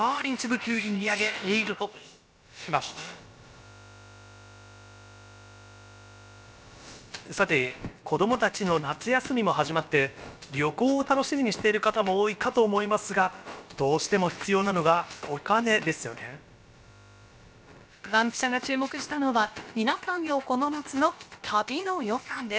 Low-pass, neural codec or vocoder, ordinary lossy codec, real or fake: none; codec, 16 kHz, about 1 kbps, DyCAST, with the encoder's durations; none; fake